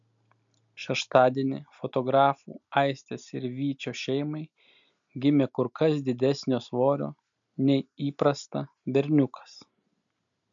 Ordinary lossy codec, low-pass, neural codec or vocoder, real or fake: MP3, 64 kbps; 7.2 kHz; none; real